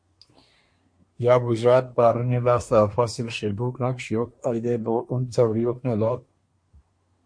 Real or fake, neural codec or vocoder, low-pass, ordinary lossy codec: fake; codec, 24 kHz, 1 kbps, SNAC; 9.9 kHz; MP3, 48 kbps